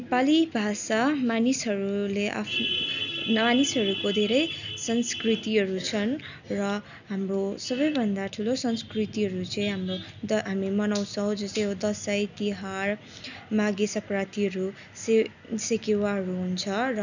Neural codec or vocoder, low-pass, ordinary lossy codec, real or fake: none; 7.2 kHz; none; real